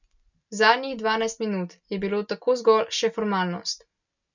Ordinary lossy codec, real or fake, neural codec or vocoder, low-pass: none; real; none; 7.2 kHz